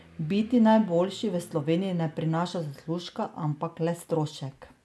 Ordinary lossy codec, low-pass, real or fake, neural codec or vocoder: none; none; real; none